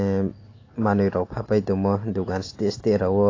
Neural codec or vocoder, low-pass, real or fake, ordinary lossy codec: none; 7.2 kHz; real; AAC, 32 kbps